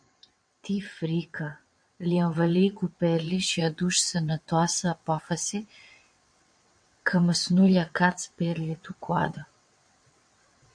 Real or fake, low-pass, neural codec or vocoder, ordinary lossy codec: fake; 9.9 kHz; vocoder, 22.05 kHz, 80 mel bands, WaveNeXt; MP3, 48 kbps